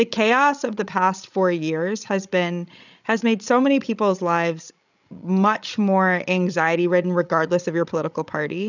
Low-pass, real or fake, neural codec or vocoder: 7.2 kHz; fake; codec, 16 kHz, 16 kbps, FreqCodec, larger model